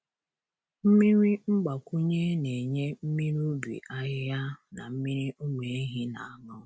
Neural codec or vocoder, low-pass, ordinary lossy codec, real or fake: none; none; none; real